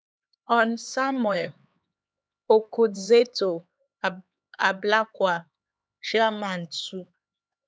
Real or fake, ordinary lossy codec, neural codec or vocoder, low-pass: fake; none; codec, 16 kHz, 4 kbps, X-Codec, HuBERT features, trained on LibriSpeech; none